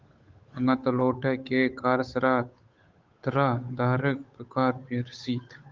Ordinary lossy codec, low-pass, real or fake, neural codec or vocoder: Opus, 32 kbps; 7.2 kHz; fake; codec, 16 kHz, 8 kbps, FunCodec, trained on Chinese and English, 25 frames a second